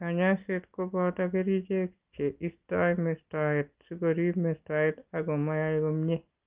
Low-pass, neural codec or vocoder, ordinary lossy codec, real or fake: 3.6 kHz; none; Opus, 32 kbps; real